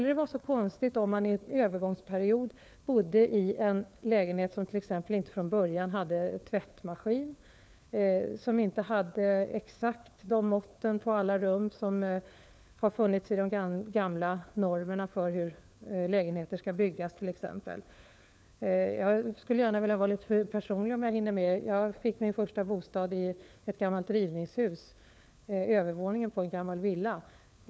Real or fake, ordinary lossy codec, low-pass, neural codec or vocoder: fake; none; none; codec, 16 kHz, 4 kbps, FunCodec, trained on LibriTTS, 50 frames a second